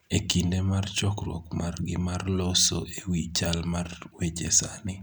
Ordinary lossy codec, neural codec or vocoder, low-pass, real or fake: none; none; none; real